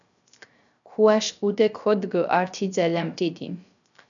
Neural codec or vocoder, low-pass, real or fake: codec, 16 kHz, 0.3 kbps, FocalCodec; 7.2 kHz; fake